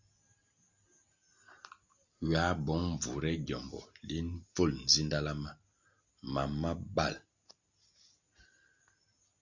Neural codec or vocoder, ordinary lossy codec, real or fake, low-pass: none; Opus, 64 kbps; real; 7.2 kHz